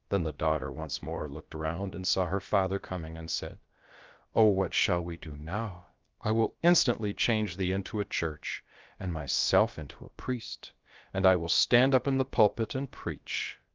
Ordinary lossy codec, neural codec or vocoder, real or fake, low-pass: Opus, 32 kbps; codec, 16 kHz, about 1 kbps, DyCAST, with the encoder's durations; fake; 7.2 kHz